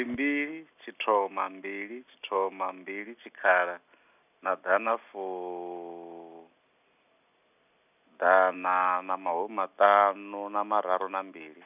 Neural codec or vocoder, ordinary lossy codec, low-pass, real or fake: none; none; 3.6 kHz; real